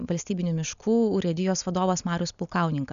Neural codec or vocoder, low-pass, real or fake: none; 7.2 kHz; real